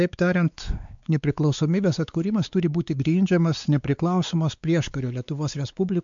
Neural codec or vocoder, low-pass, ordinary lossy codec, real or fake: codec, 16 kHz, 4 kbps, X-Codec, WavLM features, trained on Multilingual LibriSpeech; 7.2 kHz; MP3, 64 kbps; fake